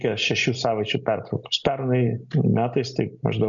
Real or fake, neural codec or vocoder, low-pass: real; none; 7.2 kHz